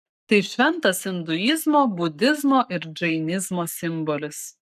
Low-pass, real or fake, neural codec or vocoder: 14.4 kHz; fake; codec, 44.1 kHz, 7.8 kbps, Pupu-Codec